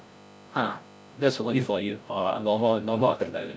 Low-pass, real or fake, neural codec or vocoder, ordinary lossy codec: none; fake; codec, 16 kHz, 0.5 kbps, FreqCodec, larger model; none